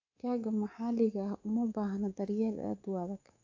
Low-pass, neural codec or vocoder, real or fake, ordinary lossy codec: 7.2 kHz; codec, 24 kHz, 3.1 kbps, DualCodec; fake; AAC, 48 kbps